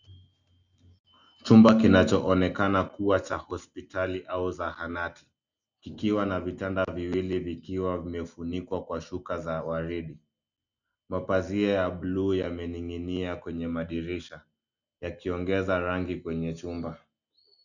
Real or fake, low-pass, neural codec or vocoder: real; 7.2 kHz; none